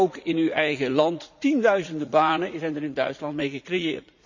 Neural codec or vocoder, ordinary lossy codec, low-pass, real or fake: vocoder, 44.1 kHz, 80 mel bands, Vocos; none; 7.2 kHz; fake